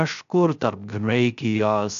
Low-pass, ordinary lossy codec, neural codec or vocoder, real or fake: 7.2 kHz; AAC, 96 kbps; codec, 16 kHz, 0.3 kbps, FocalCodec; fake